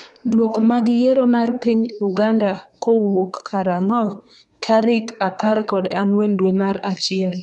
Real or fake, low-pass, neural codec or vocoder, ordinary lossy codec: fake; 10.8 kHz; codec, 24 kHz, 1 kbps, SNAC; none